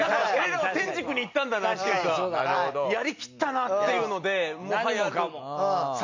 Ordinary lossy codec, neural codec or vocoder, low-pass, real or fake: MP3, 48 kbps; none; 7.2 kHz; real